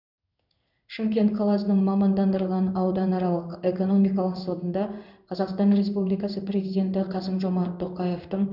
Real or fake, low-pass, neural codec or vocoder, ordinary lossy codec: fake; 5.4 kHz; codec, 16 kHz in and 24 kHz out, 1 kbps, XY-Tokenizer; none